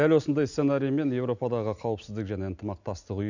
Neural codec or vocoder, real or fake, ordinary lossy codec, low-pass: none; real; none; 7.2 kHz